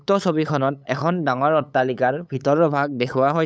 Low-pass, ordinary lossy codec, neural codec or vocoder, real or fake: none; none; codec, 16 kHz, 8 kbps, FunCodec, trained on LibriTTS, 25 frames a second; fake